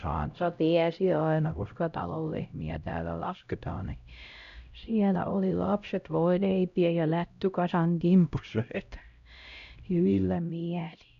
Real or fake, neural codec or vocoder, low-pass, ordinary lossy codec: fake; codec, 16 kHz, 0.5 kbps, X-Codec, HuBERT features, trained on LibriSpeech; 7.2 kHz; none